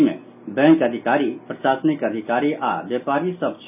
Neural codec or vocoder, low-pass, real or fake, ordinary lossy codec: none; 3.6 kHz; real; none